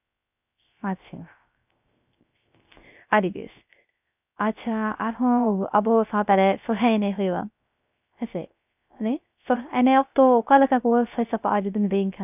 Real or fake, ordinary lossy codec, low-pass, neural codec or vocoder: fake; none; 3.6 kHz; codec, 16 kHz, 0.3 kbps, FocalCodec